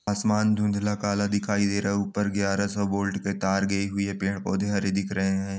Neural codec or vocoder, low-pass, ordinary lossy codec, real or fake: none; none; none; real